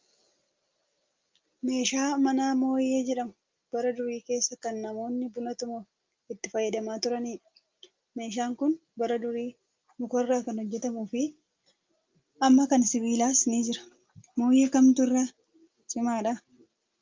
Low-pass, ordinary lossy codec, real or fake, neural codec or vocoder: 7.2 kHz; Opus, 24 kbps; real; none